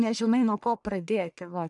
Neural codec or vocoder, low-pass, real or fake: codec, 44.1 kHz, 1.7 kbps, Pupu-Codec; 10.8 kHz; fake